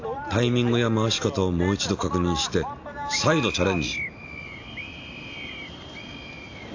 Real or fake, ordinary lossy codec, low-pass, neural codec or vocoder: real; AAC, 48 kbps; 7.2 kHz; none